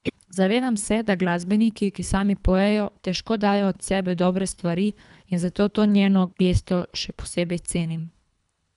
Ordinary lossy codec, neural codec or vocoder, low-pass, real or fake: none; codec, 24 kHz, 3 kbps, HILCodec; 10.8 kHz; fake